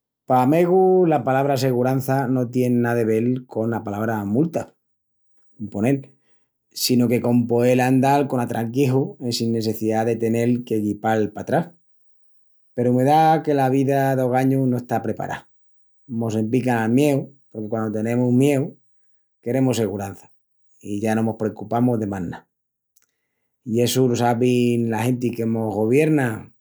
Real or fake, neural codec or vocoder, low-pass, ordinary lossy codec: real; none; none; none